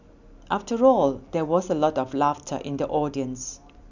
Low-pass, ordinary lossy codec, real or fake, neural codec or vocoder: 7.2 kHz; none; real; none